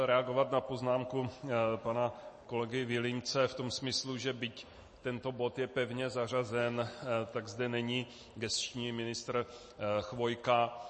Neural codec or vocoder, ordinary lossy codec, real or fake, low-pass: none; MP3, 32 kbps; real; 10.8 kHz